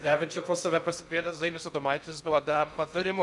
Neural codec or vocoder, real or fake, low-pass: codec, 16 kHz in and 24 kHz out, 0.6 kbps, FocalCodec, streaming, 2048 codes; fake; 10.8 kHz